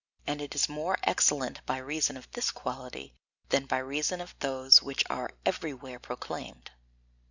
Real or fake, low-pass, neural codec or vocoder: real; 7.2 kHz; none